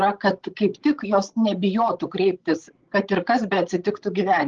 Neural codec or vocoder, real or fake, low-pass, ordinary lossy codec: codec, 16 kHz, 16 kbps, FreqCodec, larger model; fake; 7.2 kHz; Opus, 16 kbps